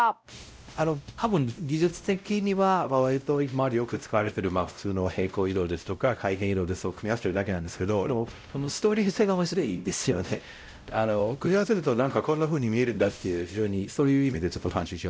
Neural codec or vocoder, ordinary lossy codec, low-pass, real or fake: codec, 16 kHz, 0.5 kbps, X-Codec, WavLM features, trained on Multilingual LibriSpeech; none; none; fake